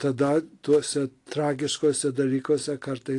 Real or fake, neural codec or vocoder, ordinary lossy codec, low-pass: real; none; AAC, 48 kbps; 10.8 kHz